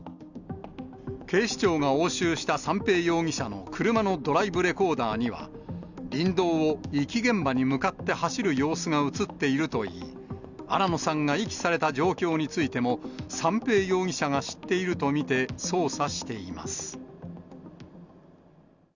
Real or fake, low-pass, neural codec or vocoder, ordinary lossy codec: real; 7.2 kHz; none; none